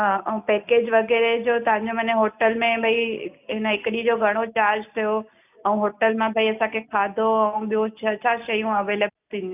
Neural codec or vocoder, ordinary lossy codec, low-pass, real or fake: none; none; 3.6 kHz; real